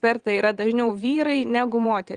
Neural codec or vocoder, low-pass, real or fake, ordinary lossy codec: vocoder, 22.05 kHz, 80 mel bands, WaveNeXt; 9.9 kHz; fake; Opus, 32 kbps